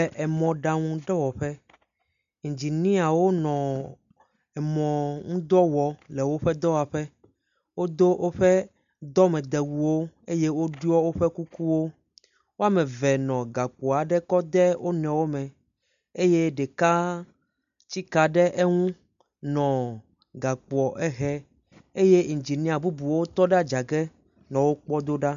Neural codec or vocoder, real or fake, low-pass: none; real; 7.2 kHz